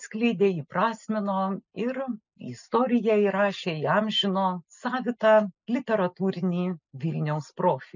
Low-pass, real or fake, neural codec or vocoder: 7.2 kHz; real; none